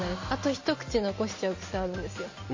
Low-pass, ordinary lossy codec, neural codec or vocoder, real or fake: 7.2 kHz; MP3, 32 kbps; none; real